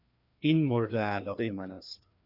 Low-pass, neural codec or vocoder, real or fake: 5.4 kHz; codec, 16 kHz, 2 kbps, FreqCodec, larger model; fake